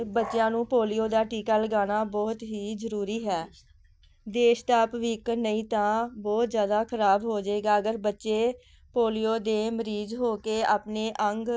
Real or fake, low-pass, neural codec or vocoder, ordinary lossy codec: real; none; none; none